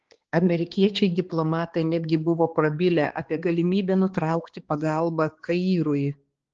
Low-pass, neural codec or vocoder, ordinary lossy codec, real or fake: 7.2 kHz; codec, 16 kHz, 2 kbps, X-Codec, HuBERT features, trained on balanced general audio; Opus, 16 kbps; fake